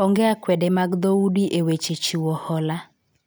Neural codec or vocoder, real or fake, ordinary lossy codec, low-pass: none; real; none; none